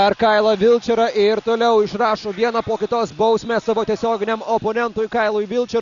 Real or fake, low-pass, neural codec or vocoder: real; 7.2 kHz; none